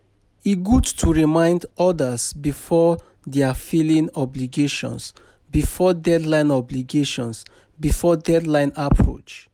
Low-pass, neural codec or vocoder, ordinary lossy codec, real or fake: 19.8 kHz; none; none; real